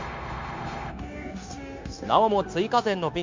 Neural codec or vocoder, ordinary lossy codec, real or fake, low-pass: codec, 16 kHz, 0.9 kbps, LongCat-Audio-Codec; MP3, 64 kbps; fake; 7.2 kHz